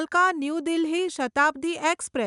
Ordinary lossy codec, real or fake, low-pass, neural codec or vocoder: none; real; 10.8 kHz; none